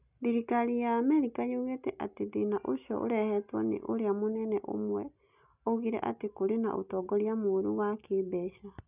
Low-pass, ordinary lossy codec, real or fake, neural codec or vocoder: 3.6 kHz; none; real; none